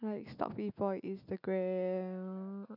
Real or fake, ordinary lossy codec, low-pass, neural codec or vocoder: real; none; 5.4 kHz; none